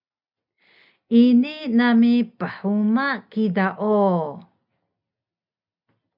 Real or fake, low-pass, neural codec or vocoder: real; 5.4 kHz; none